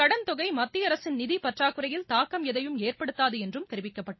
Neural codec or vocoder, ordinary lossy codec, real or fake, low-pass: none; MP3, 24 kbps; real; 7.2 kHz